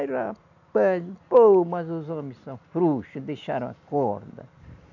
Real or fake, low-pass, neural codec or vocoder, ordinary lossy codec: real; 7.2 kHz; none; none